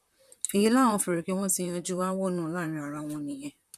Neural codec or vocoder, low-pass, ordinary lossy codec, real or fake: vocoder, 44.1 kHz, 128 mel bands, Pupu-Vocoder; 14.4 kHz; none; fake